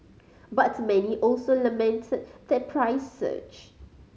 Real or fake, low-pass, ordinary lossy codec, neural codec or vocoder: real; none; none; none